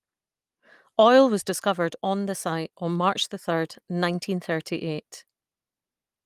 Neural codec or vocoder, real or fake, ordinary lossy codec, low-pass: none; real; Opus, 32 kbps; 14.4 kHz